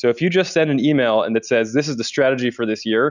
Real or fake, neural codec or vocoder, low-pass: real; none; 7.2 kHz